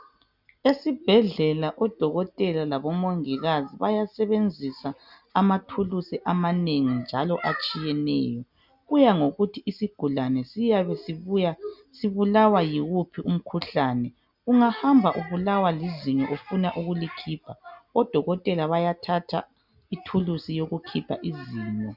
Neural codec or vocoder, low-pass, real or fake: none; 5.4 kHz; real